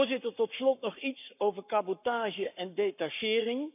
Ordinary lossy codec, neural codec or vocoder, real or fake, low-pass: none; codec, 44.1 kHz, 7.8 kbps, Pupu-Codec; fake; 3.6 kHz